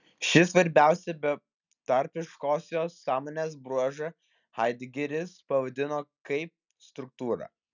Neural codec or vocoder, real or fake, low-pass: none; real; 7.2 kHz